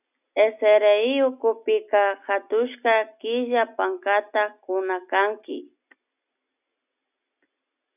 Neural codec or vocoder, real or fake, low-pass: none; real; 3.6 kHz